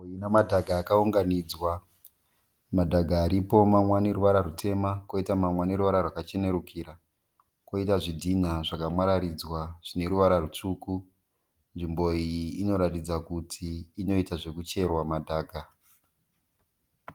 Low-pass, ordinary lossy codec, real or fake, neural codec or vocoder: 19.8 kHz; Opus, 24 kbps; real; none